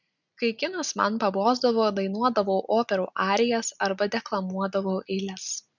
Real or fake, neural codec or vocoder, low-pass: real; none; 7.2 kHz